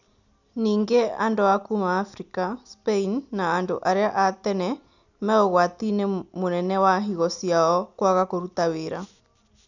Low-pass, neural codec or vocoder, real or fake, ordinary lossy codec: 7.2 kHz; none; real; none